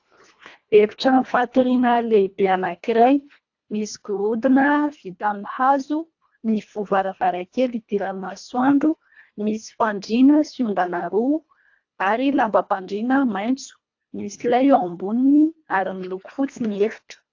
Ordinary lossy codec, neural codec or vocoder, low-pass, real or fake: AAC, 48 kbps; codec, 24 kHz, 1.5 kbps, HILCodec; 7.2 kHz; fake